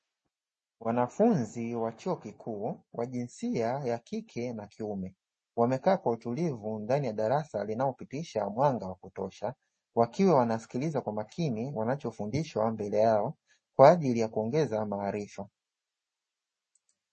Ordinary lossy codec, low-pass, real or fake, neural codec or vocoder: MP3, 32 kbps; 9.9 kHz; real; none